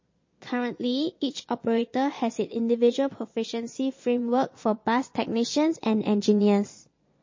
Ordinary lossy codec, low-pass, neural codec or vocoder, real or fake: MP3, 32 kbps; 7.2 kHz; vocoder, 22.05 kHz, 80 mel bands, Vocos; fake